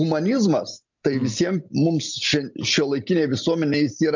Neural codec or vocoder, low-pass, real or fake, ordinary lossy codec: none; 7.2 kHz; real; MP3, 64 kbps